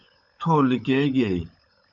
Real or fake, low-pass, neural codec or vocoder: fake; 7.2 kHz; codec, 16 kHz, 16 kbps, FunCodec, trained on LibriTTS, 50 frames a second